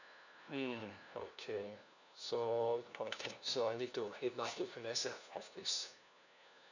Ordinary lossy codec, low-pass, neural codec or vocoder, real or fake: none; 7.2 kHz; codec, 16 kHz, 1 kbps, FunCodec, trained on LibriTTS, 50 frames a second; fake